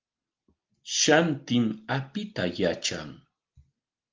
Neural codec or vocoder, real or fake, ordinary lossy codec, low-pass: none; real; Opus, 24 kbps; 7.2 kHz